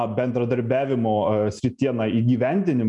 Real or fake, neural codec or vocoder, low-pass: real; none; 10.8 kHz